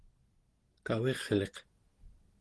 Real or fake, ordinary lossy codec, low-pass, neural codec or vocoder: real; Opus, 16 kbps; 10.8 kHz; none